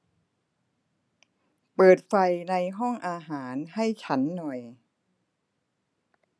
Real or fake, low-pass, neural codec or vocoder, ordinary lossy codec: real; none; none; none